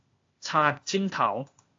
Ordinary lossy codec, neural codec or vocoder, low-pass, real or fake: AAC, 48 kbps; codec, 16 kHz, 0.8 kbps, ZipCodec; 7.2 kHz; fake